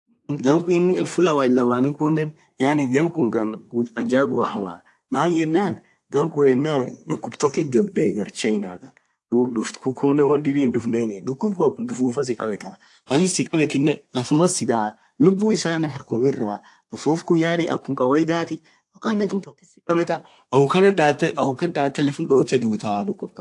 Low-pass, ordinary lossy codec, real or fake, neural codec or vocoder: 10.8 kHz; AAC, 64 kbps; fake; codec, 24 kHz, 1 kbps, SNAC